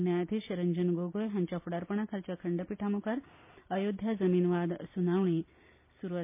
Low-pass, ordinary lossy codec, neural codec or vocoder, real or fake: 3.6 kHz; MP3, 24 kbps; none; real